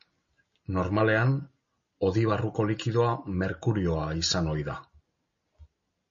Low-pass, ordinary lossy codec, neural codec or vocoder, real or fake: 7.2 kHz; MP3, 32 kbps; none; real